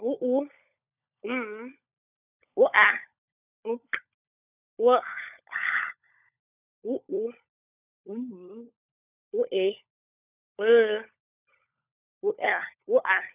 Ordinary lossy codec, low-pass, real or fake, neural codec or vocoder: none; 3.6 kHz; fake; codec, 16 kHz, 16 kbps, FunCodec, trained on LibriTTS, 50 frames a second